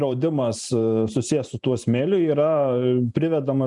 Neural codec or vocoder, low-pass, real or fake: vocoder, 44.1 kHz, 128 mel bands every 512 samples, BigVGAN v2; 10.8 kHz; fake